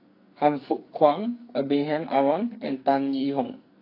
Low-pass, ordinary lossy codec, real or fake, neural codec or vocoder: 5.4 kHz; AAC, 32 kbps; fake; codec, 44.1 kHz, 2.6 kbps, SNAC